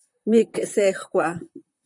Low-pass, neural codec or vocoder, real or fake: 10.8 kHz; vocoder, 44.1 kHz, 128 mel bands, Pupu-Vocoder; fake